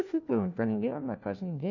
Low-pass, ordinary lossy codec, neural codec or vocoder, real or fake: 7.2 kHz; none; codec, 16 kHz, 1 kbps, FunCodec, trained on LibriTTS, 50 frames a second; fake